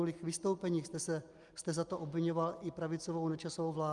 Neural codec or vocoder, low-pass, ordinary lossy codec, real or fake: none; 10.8 kHz; Opus, 32 kbps; real